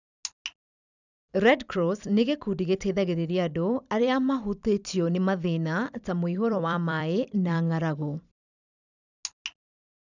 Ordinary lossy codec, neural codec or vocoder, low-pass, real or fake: none; vocoder, 44.1 kHz, 128 mel bands every 256 samples, BigVGAN v2; 7.2 kHz; fake